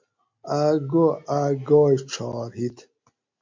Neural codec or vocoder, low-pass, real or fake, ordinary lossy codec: none; 7.2 kHz; real; MP3, 48 kbps